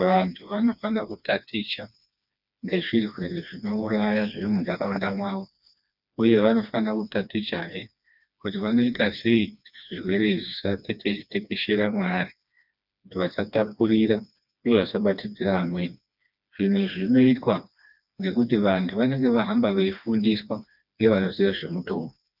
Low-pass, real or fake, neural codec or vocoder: 5.4 kHz; fake; codec, 16 kHz, 2 kbps, FreqCodec, smaller model